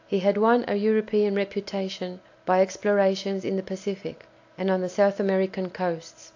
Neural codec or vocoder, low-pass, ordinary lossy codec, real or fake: none; 7.2 kHz; AAC, 48 kbps; real